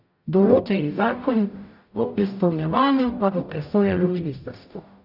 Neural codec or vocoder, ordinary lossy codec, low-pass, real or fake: codec, 44.1 kHz, 0.9 kbps, DAC; none; 5.4 kHz; fake